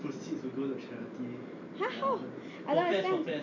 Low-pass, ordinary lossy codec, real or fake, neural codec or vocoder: 7.2 kHz; none; real; none